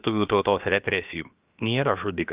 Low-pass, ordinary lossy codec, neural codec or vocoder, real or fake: 3.6 kHz; Opus, 32 kbps; codec, 16 kHz, about 1 kbps, DyCAST, with the encoder's durations; fake